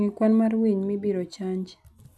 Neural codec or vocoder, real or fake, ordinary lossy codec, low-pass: none; real; none; none